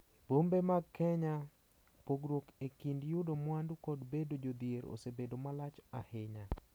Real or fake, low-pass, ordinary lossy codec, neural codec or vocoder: real; none; none; none